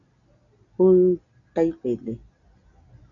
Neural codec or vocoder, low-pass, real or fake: none; 7.2 kHz; real